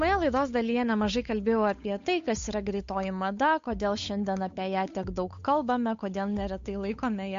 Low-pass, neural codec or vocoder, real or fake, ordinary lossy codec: 7.2 kHz; codec, 16 kHz, 8 kbps, FunCodec, trained on Chinese and English, 25 frames a second; fake; MP3, 48 kbps